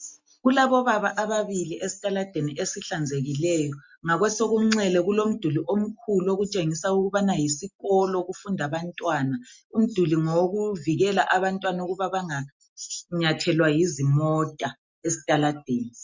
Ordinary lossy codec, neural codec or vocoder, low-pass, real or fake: MP3, 64 kbps; none; 7.2 kHz; real